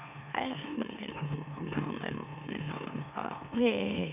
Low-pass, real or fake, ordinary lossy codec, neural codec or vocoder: 3.6 kHz; fake; none; autoencoder, 44.1 kHz, a latent of 192 numbers a frame, MeloTTS